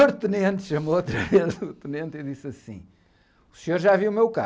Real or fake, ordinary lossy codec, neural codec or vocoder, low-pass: real; none; none; none